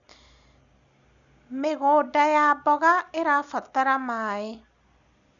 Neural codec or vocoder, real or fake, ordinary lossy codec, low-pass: none; real; none; 7.2 kHz